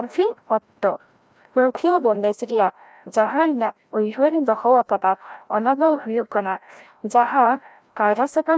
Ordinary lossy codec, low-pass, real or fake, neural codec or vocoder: none; none; fake; codec, 16 kHz, 0.5 kbps, FreqCodec, larger model